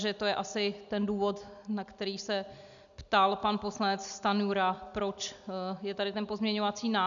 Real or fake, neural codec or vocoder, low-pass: real; none; 7.2 kHz